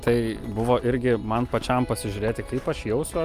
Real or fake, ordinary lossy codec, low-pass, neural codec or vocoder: real; Opus, 32 kbps; 14.4 kHz; none